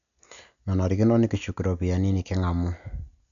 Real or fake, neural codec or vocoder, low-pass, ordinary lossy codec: real; none; 7.2 kHz; none